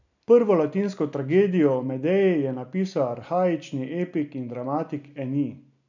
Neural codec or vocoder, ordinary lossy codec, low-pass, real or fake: none; none; 7.2 kHz; real